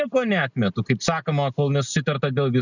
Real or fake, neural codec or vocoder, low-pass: real; none; 7.2 kHz